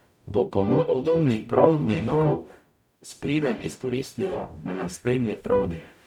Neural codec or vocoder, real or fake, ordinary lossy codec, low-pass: codec, 44.1 kHz, 0.9 kbps, DAC; fake; none; 19.8 kHz